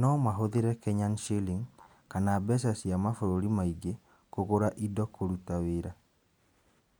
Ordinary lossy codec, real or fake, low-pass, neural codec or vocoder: none; real; none; none